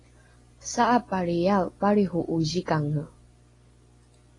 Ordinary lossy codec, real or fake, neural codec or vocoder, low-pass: AAC, 32 kbps; real; none; 10.8 kHz